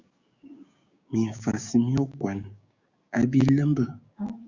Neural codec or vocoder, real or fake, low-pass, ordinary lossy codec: codec, 16 kHz, 6 kbps, DAC; fake; 7.2 kHz; Opus, 64 kbps